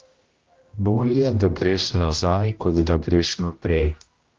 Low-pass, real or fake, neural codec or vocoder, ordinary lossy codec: 7.2 kHz; fake; codec, 16 kHz, 0.5 kbps, X-Codec, HuBERT features, trained on general audio; Opus, 32 kbps